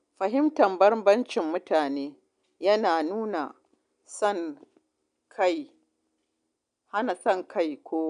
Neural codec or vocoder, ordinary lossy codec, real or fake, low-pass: none; none; real; 9.9 kHz